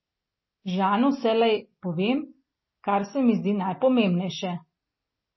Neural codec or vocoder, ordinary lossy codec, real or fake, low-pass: none; MP3, 24 kbps; real; 7.2 kHz